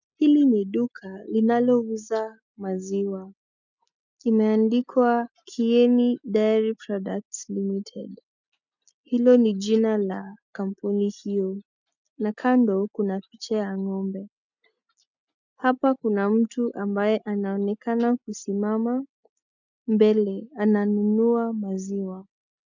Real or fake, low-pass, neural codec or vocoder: real; 7.2 kHz; none